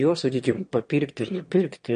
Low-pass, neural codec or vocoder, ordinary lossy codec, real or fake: 9.9 kHz; autoencoder, 22.05 kHz, a latent of 192 numbers a frame, VITS, trained on one speaker; MP3, 48 kbps; fake